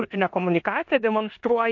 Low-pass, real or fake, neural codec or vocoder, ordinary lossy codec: 7.2 kHz; fake; codec, 16 kHz in and 24 kHz out, 0.9 kbps, LongCat-Audio-Codec, four codebook decoder; MP3, 48 kbps